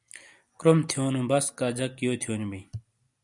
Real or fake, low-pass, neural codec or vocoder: real; 10.8 kHz; none